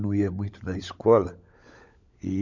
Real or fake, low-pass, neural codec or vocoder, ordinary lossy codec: fake; 7.2 kHz; codec, 16 kHz, 16 kbps, FunCodec, trained on LibriTTS, 50 frames a second; none